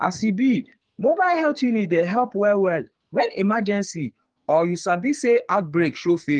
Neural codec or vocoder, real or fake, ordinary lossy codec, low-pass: codec, 44.1 kHz, 2.6 kbps, SNAC; fake; Opus, 24 kbps; 9.9 kHz